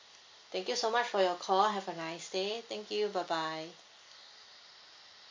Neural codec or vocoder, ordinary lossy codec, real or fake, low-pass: none; MP3, 48 kbps; real; 7.2 kHz